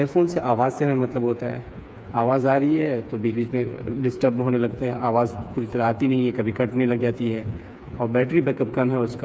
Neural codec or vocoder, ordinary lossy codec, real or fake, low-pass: codec, 16 kHz, 4 kbps, FreqCodec, smaller model; none; fake; none